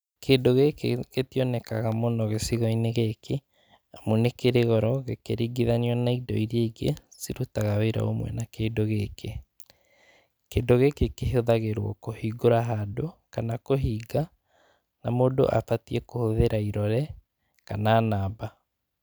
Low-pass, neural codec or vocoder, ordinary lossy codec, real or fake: none; none; none; real